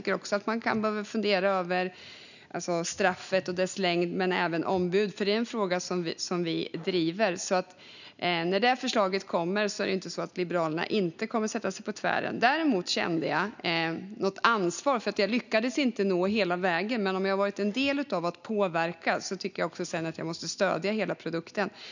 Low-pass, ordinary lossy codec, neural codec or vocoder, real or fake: 7.2 kHz; none; none; real